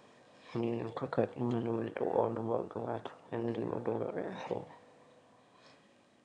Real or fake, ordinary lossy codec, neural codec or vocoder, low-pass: fake; none; autoencoder, 22.05 kHz, a latent of 192 numbers a frame, VITS, trained on one speaker; 9.9 kHz